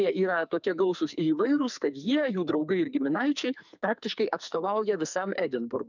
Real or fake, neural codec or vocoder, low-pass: fake; codec, 44.1 kHz, 2.6 kbps, SNAC; 7.2 kHz